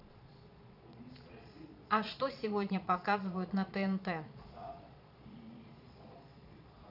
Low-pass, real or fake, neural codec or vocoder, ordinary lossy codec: 5.4 kHz; fake; vocoder, 22.05 kHz, 80 mel bands, Vocos; none